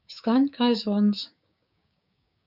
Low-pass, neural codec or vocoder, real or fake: 5.4 kHz; codec, 16 kHz, 6 kbps, DAC; fake